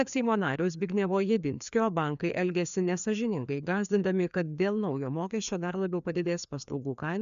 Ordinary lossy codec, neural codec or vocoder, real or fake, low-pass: MP3, 96 kbps; codec, 16 kHz, 2 kbps, FreqCodec, larger model; fake; 7.2 kHz